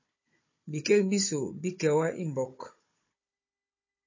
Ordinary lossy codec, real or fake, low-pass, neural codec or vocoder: MP3, 32 kbps; fake; 7.2 kHz; codec, 16 kHz, 16 kbps, FunCodec, trained on Chinese and English, 50 frames a second